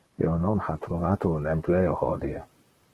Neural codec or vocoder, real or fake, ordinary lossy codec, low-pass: vocoder, 44.1 kHz, 128 mel bands, Pupu-Vocoder; fake; Opus, 16 kbps; 14.4 kHz